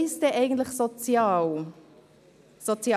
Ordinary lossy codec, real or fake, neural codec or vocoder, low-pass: none; real; none; 14.4 kHz